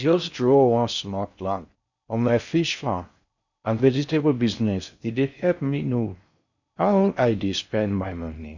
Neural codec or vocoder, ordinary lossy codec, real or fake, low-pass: codec, 16 kHz in and 24 kHz out, 0.6 kbps, FocalCodec, streaming, 4096 codes; none; fake; 7.2 kHz